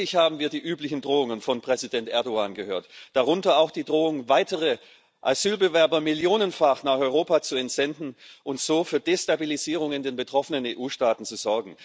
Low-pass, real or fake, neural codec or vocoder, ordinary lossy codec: none; real; none; none